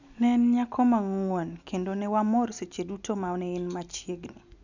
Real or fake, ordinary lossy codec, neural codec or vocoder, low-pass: real; none; none; 7.2 kHz